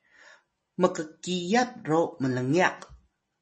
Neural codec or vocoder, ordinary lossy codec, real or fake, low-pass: none; MP3, 32 kbps; real; 10.8 kHz